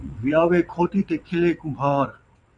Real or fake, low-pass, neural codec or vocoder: fake; 9.9 kHz; vocoder, 22.05 kHz, 80 mel bands, WaveNeXt